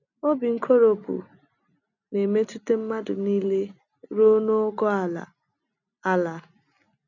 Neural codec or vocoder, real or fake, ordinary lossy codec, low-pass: none; real; none; 7.2 kHz